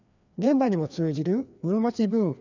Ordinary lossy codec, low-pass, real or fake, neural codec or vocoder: none; 7.2 kHz; fake; codec, 16 kHz, 2 kbps, FreqCodec, larger model